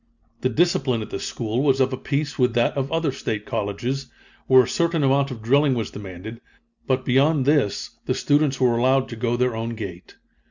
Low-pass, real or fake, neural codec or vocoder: 7.2 kHz; real; none